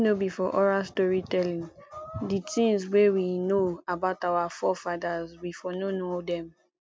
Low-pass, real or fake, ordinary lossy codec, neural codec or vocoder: none; real; none; none